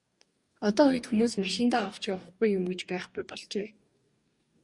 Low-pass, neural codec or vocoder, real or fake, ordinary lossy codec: 10.8 kHz; codec, 44.1 kHz, 2.6 kbps, DAC; fake; Opus, 64 kbps